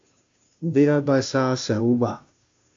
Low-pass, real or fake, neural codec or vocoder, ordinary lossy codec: 7.2 kHz; fake; codec, 16 kHz, 0.5 kbps, FunCodec, trained on Chinese and English, 25 frames a second; AAC, 48 kbps